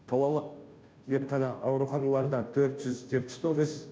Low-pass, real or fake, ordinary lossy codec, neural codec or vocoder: none; fake; none; codec, 16 kHz, 0.5 kbps, FunCodec, trained on Chinese and English, 25 frames a second